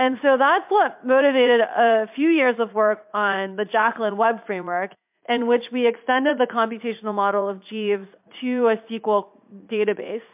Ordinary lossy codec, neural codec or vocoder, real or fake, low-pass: MP3, 32 kbps; vocoder, 44.1 kHz, 80 mel bands, Vocos; fake; 3.6 kHz